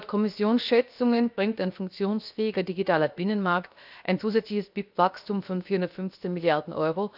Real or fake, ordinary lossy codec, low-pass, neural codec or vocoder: fake; none; 5.4 kHz; codec, 16 kHz, 0.7 kbps, FocalCodec